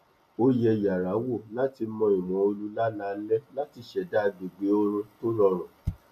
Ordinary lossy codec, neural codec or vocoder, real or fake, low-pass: AAC, 96 kbps; none; real; 14.4 kHz